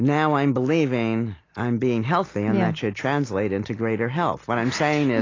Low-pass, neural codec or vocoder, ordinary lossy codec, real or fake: 7.2 kHz; none; AAC, 32 kbps; real